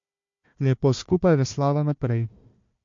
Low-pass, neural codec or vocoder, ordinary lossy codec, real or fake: 7.2 kHz; codec, 16 kHz, 1 kbps, FunCodec, trained on Chinese and English, 50 frames a second; MP3, 48 kbps; fake